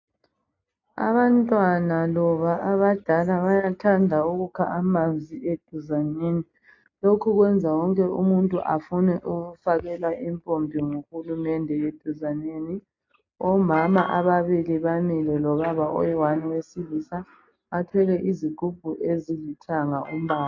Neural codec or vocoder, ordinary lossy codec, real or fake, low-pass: none; AAC, 48 kbps; real; 7.2 kHz